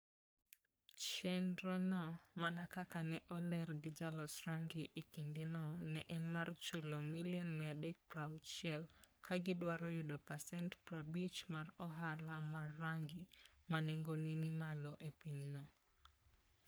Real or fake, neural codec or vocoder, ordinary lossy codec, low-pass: fake; codec, 44.1 kHz, 3.4 kbps, Pupu-Codec; none; none